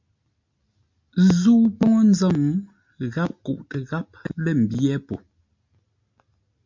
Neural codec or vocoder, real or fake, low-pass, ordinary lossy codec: none; real; 7.2 kHz; MP3, 64 kbps